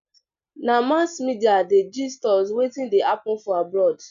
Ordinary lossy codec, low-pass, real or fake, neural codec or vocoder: none; 7.2 kHz; real; none